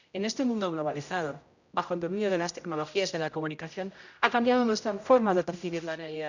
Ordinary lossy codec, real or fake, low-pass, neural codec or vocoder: none; fake; 7.2 kHz; codec, 16 kHz, 0.5 kbps, X-Codec, HuBERT features, trained on general audio